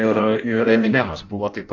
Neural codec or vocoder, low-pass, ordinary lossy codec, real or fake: codec, 16 kHz in and 24 kHz out, 0.6 kbps, FireRedTTS-2 codec; 7.2 kHz; AAC, 48 kbps; fake